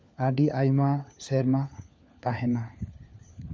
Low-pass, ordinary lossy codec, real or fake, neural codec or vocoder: none; none; fake; codec, 16 kHz, 4 kbps, FunCodec, trained on LibriTTS, 50 frames a second